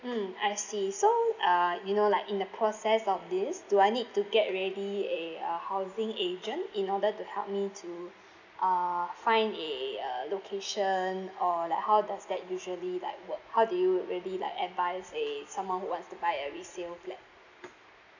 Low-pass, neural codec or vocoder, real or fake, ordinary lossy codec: 7.2 kHz; none; real; none